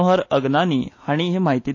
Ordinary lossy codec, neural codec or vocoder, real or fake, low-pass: AAC, 48 kbps; none; real; 7.2 kHz